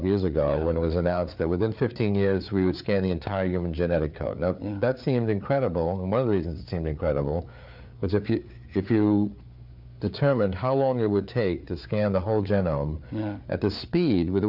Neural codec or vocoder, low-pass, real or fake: codec, 16 kHz, 4 kbps, FreqCodec, larger model; 5.4 kHz; fake